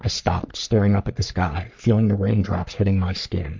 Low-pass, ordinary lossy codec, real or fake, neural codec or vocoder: 7.2 kHz; MP3, 64 kbps; fake; codec, 44.1 kHz, 3.4 kbps, Pupu-Codec